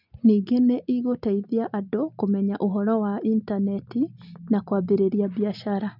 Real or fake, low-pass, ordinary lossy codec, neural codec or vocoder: real; 5.4 kHz; none; none